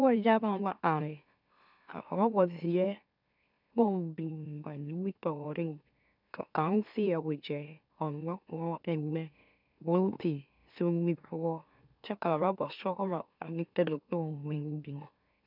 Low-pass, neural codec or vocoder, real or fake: 5.4 kHz; autoencoder, 44.1 kHz, a latent of 192 numbers a frame, MeloTTS; fake